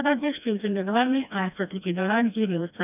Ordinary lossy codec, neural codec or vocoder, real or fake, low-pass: none; codec, 16 kHz, 1 kbps, FreqCodec, smaller model; fake; 3.6 kHz